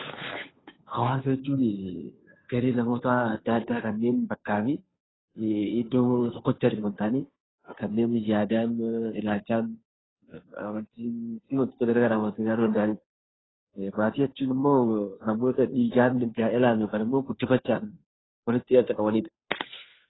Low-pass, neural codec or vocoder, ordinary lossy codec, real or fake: 7.2 kHz; codec, 16 kHz, 2 kbps, FunCodec, trained on Chinese and English, 25 frames a second; AAC, 16 kbps; fake